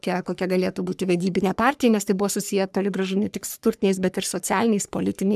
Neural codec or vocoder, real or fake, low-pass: codec, 44.1 kHz, 3.4 kbps, Pupu-Codec; fake; 14.4 kHz